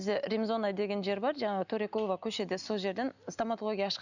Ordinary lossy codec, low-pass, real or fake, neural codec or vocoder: none; 7.2 kHz; real; none